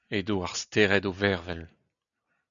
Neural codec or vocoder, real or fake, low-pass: none; real; 7.2 kHz